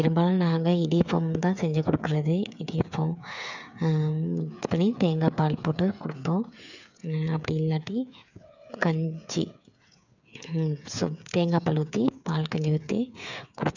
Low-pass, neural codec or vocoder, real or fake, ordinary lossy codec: 7.2 kHz; codec, 16 kHz, 8 kbps, FreqCodec, smaller model; fake; none